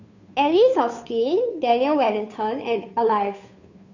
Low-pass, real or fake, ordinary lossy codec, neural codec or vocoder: 7.2 kHz; fake; none; codec, 16 kHz, 2 kbps, FunCodec, trained on Chinese and English, 25 frames a second